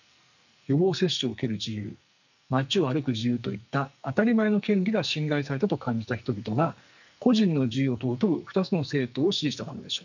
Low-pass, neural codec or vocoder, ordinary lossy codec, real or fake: 7.2 kHz; codec, 44.1 kHz, 2.6 kbps, SNAC; none; fake